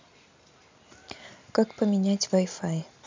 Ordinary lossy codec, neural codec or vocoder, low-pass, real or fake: MP3, 64 kbps; none; 7.2 kHz; real